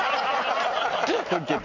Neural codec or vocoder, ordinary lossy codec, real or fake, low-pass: none; none; real; 7.2 kHz